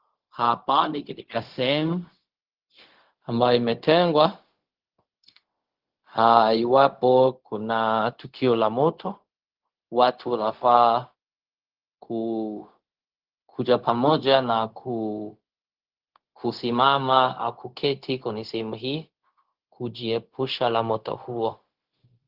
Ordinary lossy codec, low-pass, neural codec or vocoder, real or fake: Opus, 16 kbps; 5.4 kHz; codec, 16 kHz, 0.4 kbps, LongCat-Audio-Codec; fake